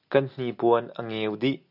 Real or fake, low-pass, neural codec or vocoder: real; 5.4 kHz; none